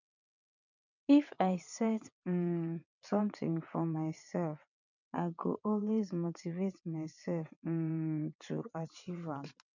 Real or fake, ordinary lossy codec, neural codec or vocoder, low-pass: fake; none; vocoder, 22.05 kHz, 80 mel bands, WaveNeXt; 7.2 kHz